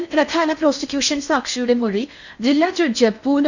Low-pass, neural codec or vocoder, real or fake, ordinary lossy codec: 7.2 kHz; codec, 16 kHz in and 24 kHz out, 0.6 kbps, FocalCodec, streaming, 4096 codes; fake; none